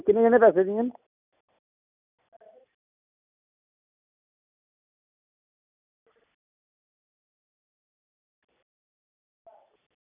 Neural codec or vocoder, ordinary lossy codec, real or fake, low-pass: none; none; real; 3.6 kHz